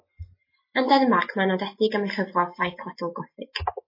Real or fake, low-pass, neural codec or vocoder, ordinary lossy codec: real; 5.4 kHz; none; MP3, 24 kbps